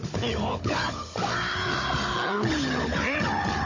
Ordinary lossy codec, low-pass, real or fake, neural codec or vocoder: MP3, 32 kbps; 7.2 kHz; fake; codec, 16 kHz, 16 kbps, FunCodec, trained on Chinese and English, 50 frames a second